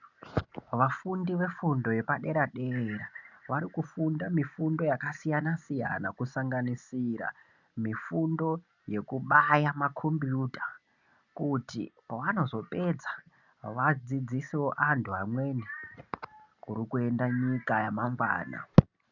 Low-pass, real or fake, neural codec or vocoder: 7.2 kHz; real; none